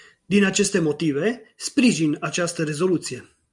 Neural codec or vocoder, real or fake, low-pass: none; real; 10.8 kHz